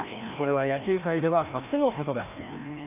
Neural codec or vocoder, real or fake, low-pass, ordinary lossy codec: codec, 16 kHz, 1 kbps, FreqCodec, larger model; fake; 3.6 kHz; none